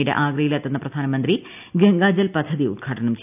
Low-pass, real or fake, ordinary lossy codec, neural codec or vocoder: 3.6 kHz; real; none; none